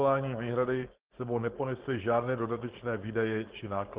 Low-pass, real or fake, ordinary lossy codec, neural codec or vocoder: 3.6 kHz; fake; Opus, 16 kbps; codec, 16 kHz, 4.8 kbps, FACodec